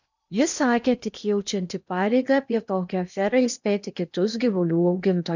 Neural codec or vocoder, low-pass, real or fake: codec, 16 kHz in and 24 kHz out, 0.8 kbps, FocalCodec, streaming, 65536 codes; 7.2 kHz; fake